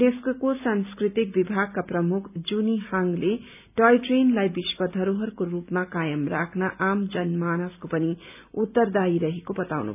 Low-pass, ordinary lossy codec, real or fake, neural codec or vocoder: 3.6 kHz; none; real; none